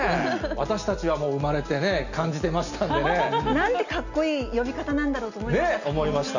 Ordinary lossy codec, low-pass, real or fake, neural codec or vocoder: none; 7.2 kHz; real; none